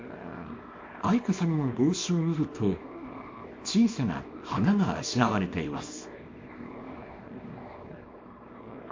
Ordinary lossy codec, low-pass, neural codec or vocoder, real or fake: MP3, 48 kbps; 7.2 kHz; codec, 24 kHz, 0.9 kbps, WavTokenizer, small release; fake